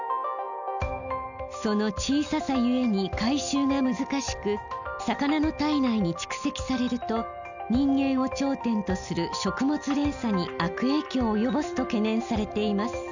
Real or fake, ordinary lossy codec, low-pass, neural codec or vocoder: real; none; 7.2 kHz; none